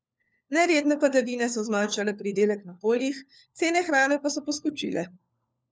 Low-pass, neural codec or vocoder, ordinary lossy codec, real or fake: none; codec, 16 kHz, 4 kbps, FunCodec, trained on LibriTTS, 50 frames a second; none; fake